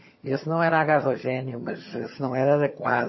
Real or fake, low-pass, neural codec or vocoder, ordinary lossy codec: fake; 7.2 kHz; vocoder, 22.05 kHz, 80 mel bands, HiFi-GAN; MP3, 24 kbps